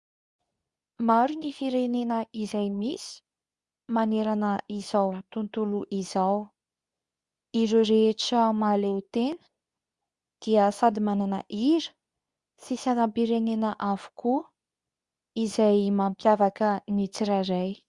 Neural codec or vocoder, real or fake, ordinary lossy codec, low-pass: codec, 24 kHz, 0.9 kbps, WavTokenizer, medium speech release version 2; fake; Opus, 64 kbps; 10.8 kHz